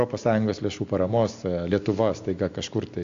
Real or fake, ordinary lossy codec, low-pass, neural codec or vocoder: real; AAC, 64 kbps; 7.2 kHz; none